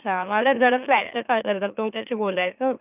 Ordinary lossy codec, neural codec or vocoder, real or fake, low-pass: none; autoencoder, 44.1 kHz, a latent of 192 numbers a frame, MeloTTS; fake; 3.6 kHz